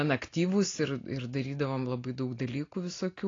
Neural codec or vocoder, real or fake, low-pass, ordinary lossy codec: none; real; 7.2 kHz; AAC, 32 kbps